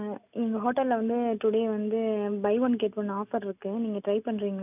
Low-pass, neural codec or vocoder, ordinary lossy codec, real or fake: 3.6 kHz; none; none; real